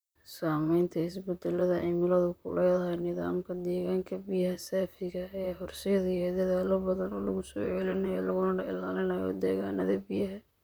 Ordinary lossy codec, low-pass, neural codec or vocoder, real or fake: none; none; vocoder, 44.1 kHz, 128 mel bands, Pupu-Vocoder; fake